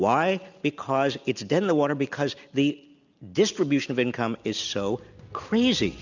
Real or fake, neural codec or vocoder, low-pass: real; none; 7.2 kHz